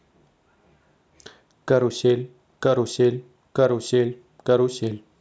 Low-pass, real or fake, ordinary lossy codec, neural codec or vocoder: none; real; none; none